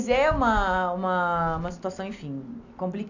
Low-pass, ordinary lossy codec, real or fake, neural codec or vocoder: 7.2 kHz; none; real; none